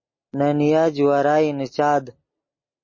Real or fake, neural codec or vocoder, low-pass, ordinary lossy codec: real; none; 7.2 kHz; MP3, 32 kbps